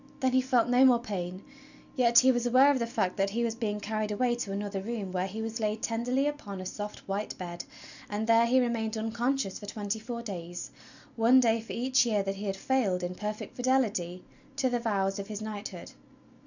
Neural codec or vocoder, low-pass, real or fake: none; 7.2 kHz; real